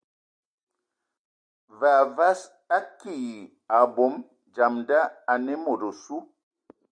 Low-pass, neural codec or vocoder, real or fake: 9.9 kHz; none; real